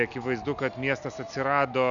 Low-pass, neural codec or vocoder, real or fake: 7.2 kHz; none; real